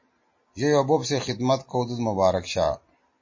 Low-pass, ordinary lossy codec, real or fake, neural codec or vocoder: 7.2 kHz; MP3, 32 kbps; real; none